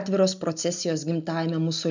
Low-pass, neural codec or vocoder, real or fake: 7.2 kHz; none; real